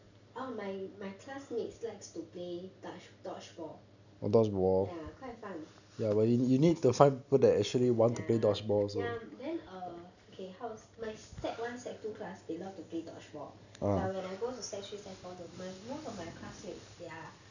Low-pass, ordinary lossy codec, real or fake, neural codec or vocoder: 7.2 kHz; none; real; none